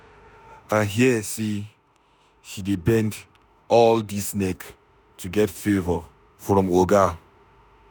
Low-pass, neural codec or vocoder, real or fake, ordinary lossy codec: none; autoencoder, 48 kHz, 32 numbers a frame, DAC-VAE, trained on Japanese speech; fake; none